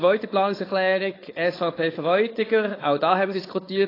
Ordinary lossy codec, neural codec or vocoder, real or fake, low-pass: AAC, 24 kbps; codec, 16 kHz, 4.8 kbps, FACodec; fake; 5.4 kHz